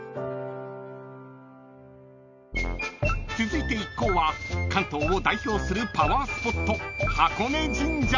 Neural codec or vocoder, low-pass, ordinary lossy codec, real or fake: none; 7.2 kHz; none; real